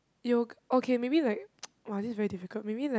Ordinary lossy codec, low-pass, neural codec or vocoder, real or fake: none; none; none; real